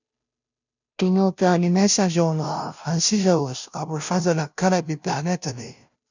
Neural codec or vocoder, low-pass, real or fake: codec, 16 kHz, 0.5 kbps, FunCodec, trained on Chinese and English, 25 frames a second; 7.2 kHz; fake